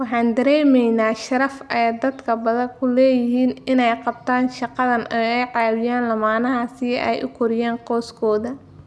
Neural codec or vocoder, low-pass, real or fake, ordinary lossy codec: none; none; real; none